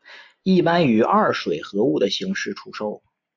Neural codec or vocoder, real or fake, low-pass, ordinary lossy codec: none; real; 7.2 kHz; MP3, 64 kbps